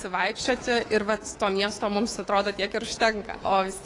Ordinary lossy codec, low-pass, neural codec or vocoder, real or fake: AAC, 32 kbps; 10.8 kHz; codec, 24 kHz, 3.1 kbps, DualCodec; fake